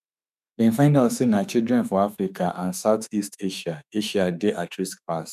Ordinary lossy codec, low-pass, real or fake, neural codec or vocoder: none; 14.4 kHz; fake; autoencoder, 48 kHz, 32 numbers a frame, DAC-VAE, trained on Japanese speech